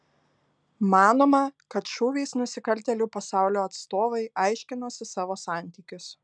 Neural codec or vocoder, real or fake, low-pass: none; real; 9.9 kHz